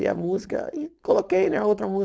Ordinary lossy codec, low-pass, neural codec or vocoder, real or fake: none; none; codec, 16 kHz, 4.8 kbps, FACodec; fake